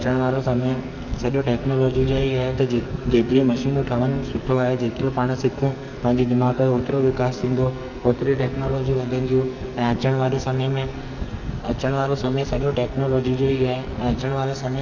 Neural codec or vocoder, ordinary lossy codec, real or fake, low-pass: codec, 44.1 kHz, 2.6 kbps, SNAC; none; fake; 7.2 kHz